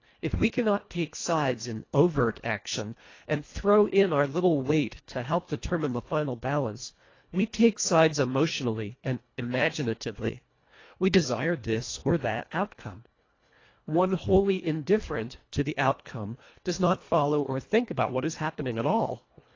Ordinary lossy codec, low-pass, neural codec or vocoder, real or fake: AAC, 32 kbps; 7.2 kHz; codec, 24 kHz, 1.5 kbps, HILCodec; fake